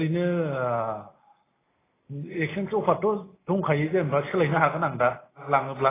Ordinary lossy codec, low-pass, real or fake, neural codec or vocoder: AAC, 16 kbps; 3.6 kHz; real; none